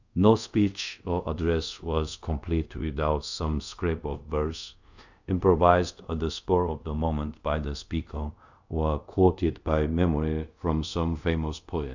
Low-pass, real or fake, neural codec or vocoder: 7.2 kHz; fake; codec, 24 kHz, 0.5 kbps, DualCodec